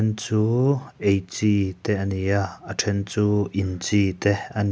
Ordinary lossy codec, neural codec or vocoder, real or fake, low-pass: none; none; real; none